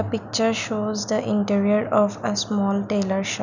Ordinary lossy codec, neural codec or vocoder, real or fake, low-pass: none; none; real; 7.2 kHz